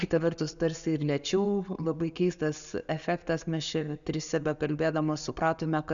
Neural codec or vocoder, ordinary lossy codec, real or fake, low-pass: none; MP3, 96 kbps; real; 7.2 kHz